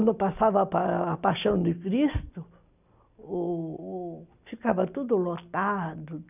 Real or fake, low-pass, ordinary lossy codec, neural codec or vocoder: real; 3.6 kHz; none; none